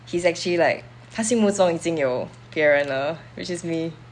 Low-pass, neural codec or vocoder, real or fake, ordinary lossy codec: 10.8 kHz; none; real; none